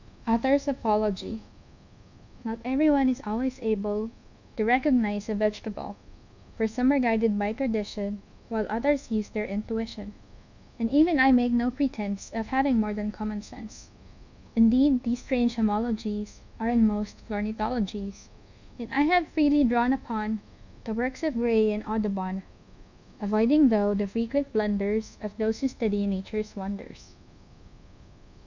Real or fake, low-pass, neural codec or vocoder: fake; 7.2 kHz; codec, 24 kHz, 1.2 kbps, DualCodec